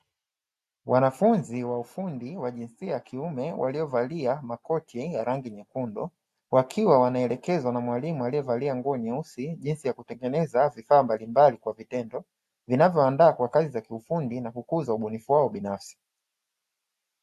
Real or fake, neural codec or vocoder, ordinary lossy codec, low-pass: real; none; AAC, 96 kbps; 14.4 kHz